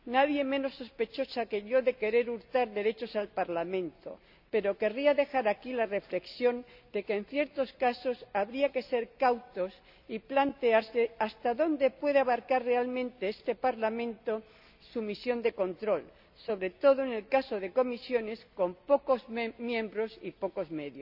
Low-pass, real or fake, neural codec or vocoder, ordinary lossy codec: 5.4 kHz; real; none; none